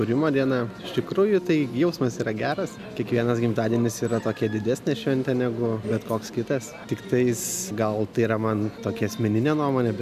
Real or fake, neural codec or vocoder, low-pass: real; none; 14.4 kHz